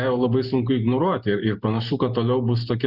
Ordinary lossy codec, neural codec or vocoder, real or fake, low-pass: Opus, 64 kbps; none; real; 5.4 kHz